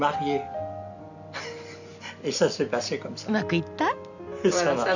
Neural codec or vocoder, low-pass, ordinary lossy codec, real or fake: none; 7.2 kHz; none; real